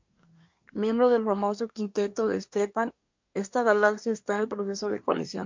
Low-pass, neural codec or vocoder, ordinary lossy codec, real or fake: 7.2 kHz; codec, 24 kHz, 1 kbps, SNAC; MP3, 48 kbps; fake